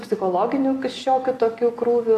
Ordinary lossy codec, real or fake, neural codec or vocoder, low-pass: AAC, 48 kbps; real; none; 14.4 kHz